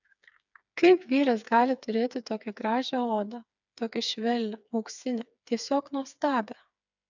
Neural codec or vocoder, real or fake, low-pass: codec, 16 kHz, 4 kbps, FreqCodec, smaller model; fake; 7.2 kHz